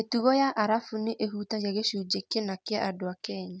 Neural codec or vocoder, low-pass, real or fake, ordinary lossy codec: none; none; real; none